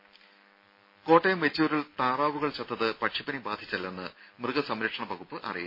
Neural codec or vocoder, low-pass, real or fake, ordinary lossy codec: none; 5.4 kHz; real; MP3, 24 kbps